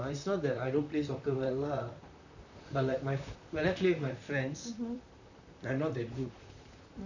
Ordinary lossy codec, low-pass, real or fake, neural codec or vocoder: none; 7.2 kHz; fake; vocoder, 44.1 kHz, 128 mel bands, Pupu-Vocoder